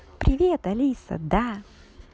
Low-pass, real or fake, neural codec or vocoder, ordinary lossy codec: none; real; none; none